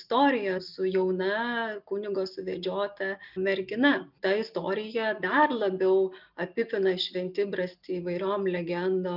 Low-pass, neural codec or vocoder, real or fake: 5.4 kHz; none; real